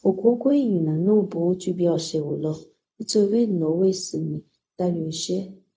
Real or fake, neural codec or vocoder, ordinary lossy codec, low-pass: fake; codec, 16 kHz, 0.4 kbps, LongCat-Audio-Codec; none; none